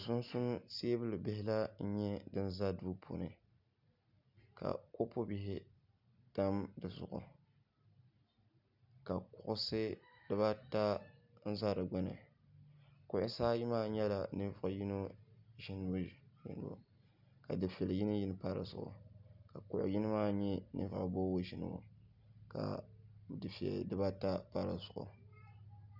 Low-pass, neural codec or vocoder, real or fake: 5.4 kHz; none; real